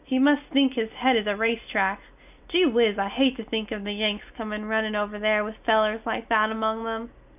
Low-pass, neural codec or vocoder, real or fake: 3.6 kHz; none; real